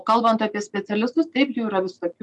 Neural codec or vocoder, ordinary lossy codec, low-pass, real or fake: none; MP3, 96 kbps; 10.8 kHz; real